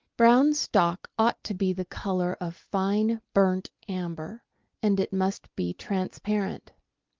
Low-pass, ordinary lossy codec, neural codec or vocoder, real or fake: 7.2 kHz; Opus, 32 kbps; none; real